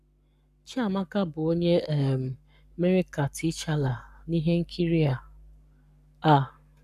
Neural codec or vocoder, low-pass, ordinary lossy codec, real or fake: codec, 44.1 kHz, 7.8 kbps, Pupu-Codec; 14.4 kHz; none; fake